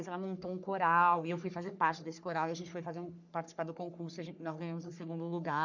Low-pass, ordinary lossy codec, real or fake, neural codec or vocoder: 7.2 kHz; none; fake; codec, 44.1 kHz, 3.4 kbps, Pupu-Codec